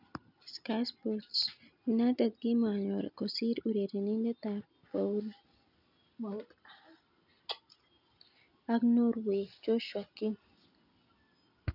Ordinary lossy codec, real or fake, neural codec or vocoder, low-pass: none; real; none; 5.4 kHz